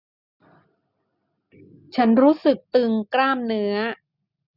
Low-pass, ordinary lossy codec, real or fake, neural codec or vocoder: 5.4 kHz; none; real; none